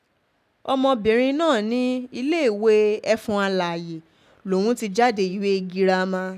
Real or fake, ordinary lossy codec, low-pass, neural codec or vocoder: real; none; 14.4 kHz; none